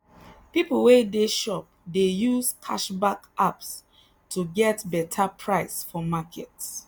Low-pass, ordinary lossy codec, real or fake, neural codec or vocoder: none; none; real; none